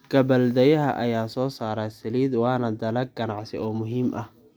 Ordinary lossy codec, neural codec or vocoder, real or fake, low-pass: none; none; real; none